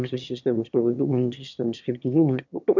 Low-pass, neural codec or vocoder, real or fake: 7.2 kHz; autoencoder, 22.05 kHz, a latent of 192 numbers a frame, VITS, trained on one speaker; fake